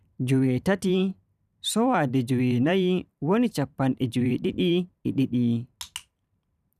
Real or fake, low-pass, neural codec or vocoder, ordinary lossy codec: fake; 14.4 kHz; vocoder, 44.1 kHz, 128 mel bands every 512 samples, BigVGAN v2; none